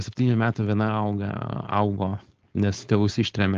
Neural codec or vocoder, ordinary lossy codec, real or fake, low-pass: codec, 16 kHz, 4.8 kbps, FACodec; Opus, 16 kbps; fake; 7.2 kHz